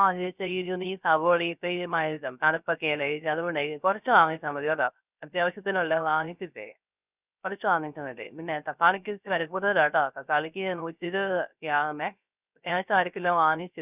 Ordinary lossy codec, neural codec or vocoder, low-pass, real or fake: none; codec, 16 kHz, 0.3 kbps, FocalCodec; 3.6 kHz; fake